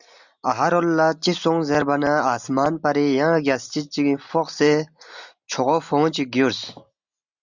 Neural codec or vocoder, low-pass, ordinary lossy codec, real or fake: none; 7.2 kHz; Opus, 64 kbps; real